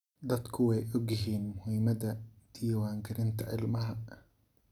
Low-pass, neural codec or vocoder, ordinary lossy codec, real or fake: 19.8 kHz; none; none; real